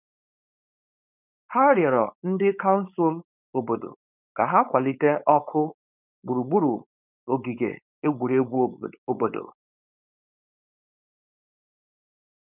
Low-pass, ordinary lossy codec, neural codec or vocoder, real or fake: 3.6 kHz; none; codec, 16 kHz, 4.8 kbps, FACodec; fake